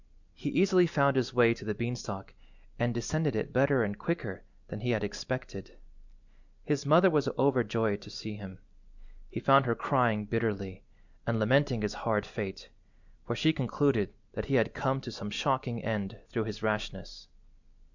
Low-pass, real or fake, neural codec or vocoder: 7.2 kHz; real; none